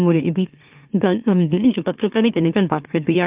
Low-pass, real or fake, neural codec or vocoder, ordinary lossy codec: 3.6 kHz; fake; autoencoder, 44.1 kHz, a latent of 192 numbers a frame, MeloTTS; Opus, 24 kbps